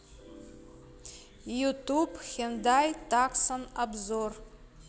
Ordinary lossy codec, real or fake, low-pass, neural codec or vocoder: none; real; none; none